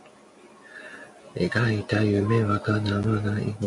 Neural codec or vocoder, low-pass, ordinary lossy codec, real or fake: none; 10.8 kHz; MP3, 96 kbps; real